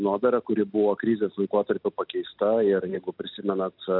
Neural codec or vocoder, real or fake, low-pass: none; real; 5.4 kHz